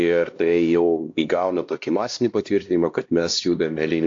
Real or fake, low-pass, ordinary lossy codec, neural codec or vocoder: fake; 7.2 kHz; AAC, 48 kbps; codec, 16 kHz, 1 kbps, X-Codec, HuBERT features, trained on LibriSpeech